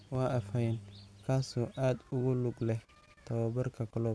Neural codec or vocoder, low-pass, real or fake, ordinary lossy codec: none; none; real; none